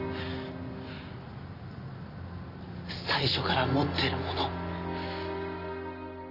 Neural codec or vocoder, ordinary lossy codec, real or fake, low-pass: none; none; real; 5.4 kHz